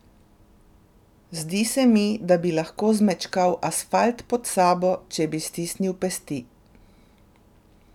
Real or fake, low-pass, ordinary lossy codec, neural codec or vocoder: real; 19.8 kHz; none; none